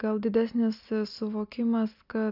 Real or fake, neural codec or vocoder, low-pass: real; none; 5.4 kHz